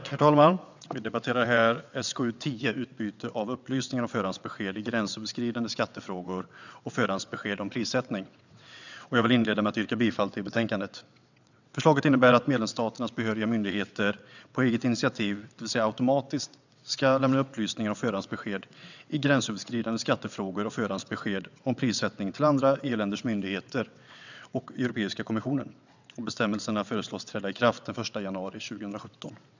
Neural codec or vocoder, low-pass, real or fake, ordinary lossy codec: vocoder, 22.05 kHz, 80 mel bands, WaveNeXt; 7.2 kHz; fake; none